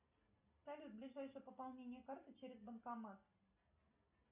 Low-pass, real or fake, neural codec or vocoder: 3.6 kHz; real; none